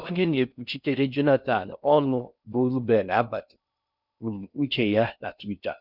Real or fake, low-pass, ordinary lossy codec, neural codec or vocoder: fake; 5.4 kHz; none; codec, 16 kHz in and 24 kHz out, 0.6 kbps, FocalCodec, streaming, 4096 codes